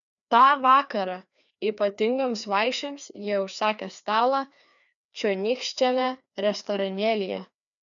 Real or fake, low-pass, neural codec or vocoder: fake; 7.2 kHz; codec, 16 kHz, 2 kbps, FreqCodec, larger model